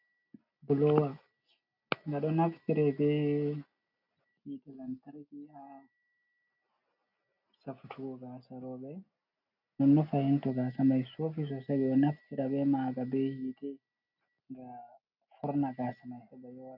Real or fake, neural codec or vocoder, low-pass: real; none; 5.4 kHz